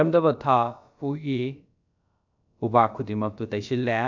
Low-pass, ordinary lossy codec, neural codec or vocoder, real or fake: 7.2 kHz; none; codec, 16 kHz, about 1 kbps, DyCAST, with the encoder's durations; fake